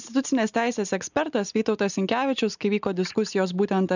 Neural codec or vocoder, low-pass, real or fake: none; 7.2 kHz; real